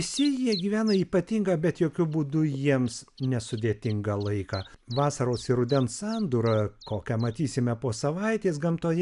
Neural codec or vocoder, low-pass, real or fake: none; 10.8 kHz; real